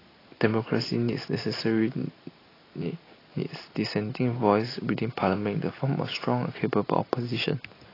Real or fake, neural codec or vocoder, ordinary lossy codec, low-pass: real; none; AAC, 24 kbps; 5.4 kHz